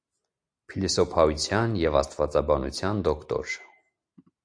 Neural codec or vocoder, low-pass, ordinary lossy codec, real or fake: none; 9.9 kHz; AAC, 64 kbps; real